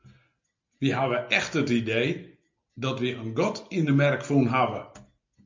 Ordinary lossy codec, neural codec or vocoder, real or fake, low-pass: MP3, 64 kbps; none; real; 7.2 kHz